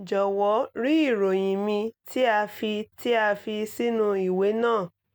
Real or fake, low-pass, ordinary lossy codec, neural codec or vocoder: real; none; none; none